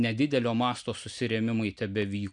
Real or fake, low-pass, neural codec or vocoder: real; 9.9 kHz; none